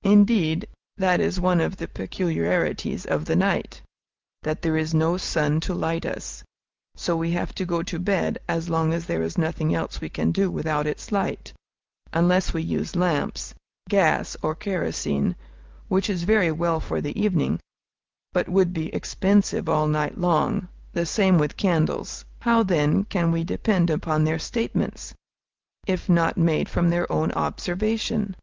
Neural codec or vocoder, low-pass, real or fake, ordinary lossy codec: none; 7.2 kHz; real; Opus, 24 kbps